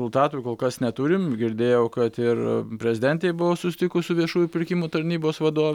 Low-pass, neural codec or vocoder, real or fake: 19.8 kHz; none; real